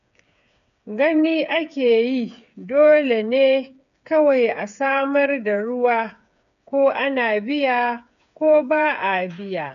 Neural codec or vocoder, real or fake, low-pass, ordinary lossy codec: codec, 16 kHz, 8 kbps, FreqCodec, smaller model; fake; 7.2 kHz; none